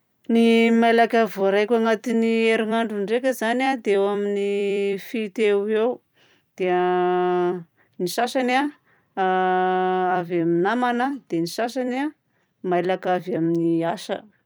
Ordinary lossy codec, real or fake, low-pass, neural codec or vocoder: none; fake; none; vocoder, 44.1 kHz, 128 mel bands every 256 samples, BigVGAN v2